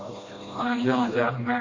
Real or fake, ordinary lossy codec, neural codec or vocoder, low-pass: fake; MP3, 64 kbps; codec, 16 kHz, 1 kbps, FreqCodec, smaller model; 7.2 kHz